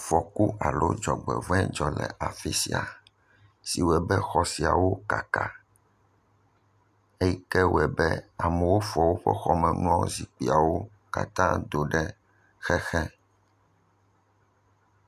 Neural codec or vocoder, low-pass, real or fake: none; 14.4 kHz; real